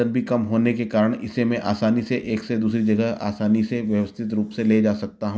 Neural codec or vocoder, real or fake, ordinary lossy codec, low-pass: none; real; none; none